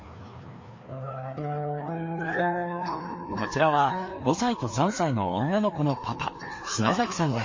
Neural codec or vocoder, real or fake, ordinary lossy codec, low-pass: codec, 16 kHz, 2 kbps, FreqCodec, larger model; fake; MP3, 32 kbps; 7.2 kHz